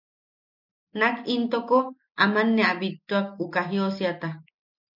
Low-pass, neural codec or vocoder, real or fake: 5.4 kHz; none; real